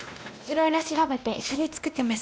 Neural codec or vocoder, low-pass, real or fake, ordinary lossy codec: codec, 16 kHz, 1 kbps, X-Codec, WavLM features, trained on Multilingual LibriSpeech; none; fake; none